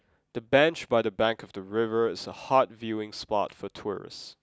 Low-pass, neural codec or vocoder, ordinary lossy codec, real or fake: none; none; none; real